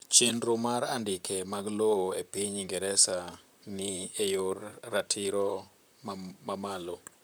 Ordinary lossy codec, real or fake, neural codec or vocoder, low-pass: none; fake; vocoder, 44.1 kHz, 128 mel bands, Pupu-Vocoder; none